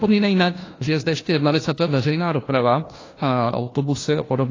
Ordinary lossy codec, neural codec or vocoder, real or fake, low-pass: AAC, 32 kbps; codec, 16 kHz, 1 kbps, FunCodec, trained on LibriTTS, 50 frames a second; fake; 7.2 kHz